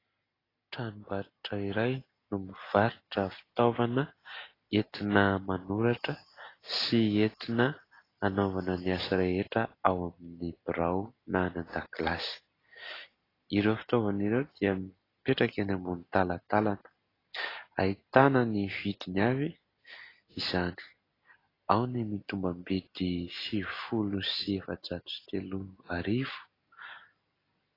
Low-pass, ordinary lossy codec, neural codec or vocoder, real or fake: 5.4 kHz; AAC, 24 kbps; none; real